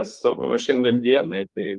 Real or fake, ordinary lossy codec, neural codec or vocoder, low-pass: fake; Opus, 32 kbps; codec, 24 kHz, 1 kbps, SNAC; 10.8 kHz